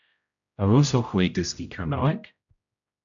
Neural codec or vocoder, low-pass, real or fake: codec, 16 kHz, 0.5 kbps, X-Codec, HuBERT features, trained on general audio; 7.2 kHz; fake